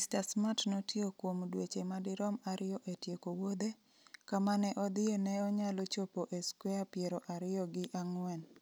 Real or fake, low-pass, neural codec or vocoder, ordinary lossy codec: real; none; none; none